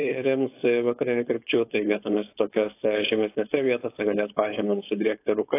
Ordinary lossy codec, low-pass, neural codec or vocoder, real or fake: AAC, 24 kbps; 3.6 kHz; codec, 16 kHz, 4.8 kbps, FACodec; fake